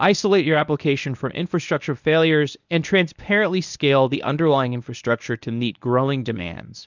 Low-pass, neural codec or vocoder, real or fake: 7.2 kHz; codec, 24 kHz, 0.9 kbps, WavTokenizer, medium speech release version 1; fake